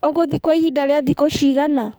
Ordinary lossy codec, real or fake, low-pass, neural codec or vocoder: none; fake; none; codec, 44.1 kHz, 2.6 kbps, SNAC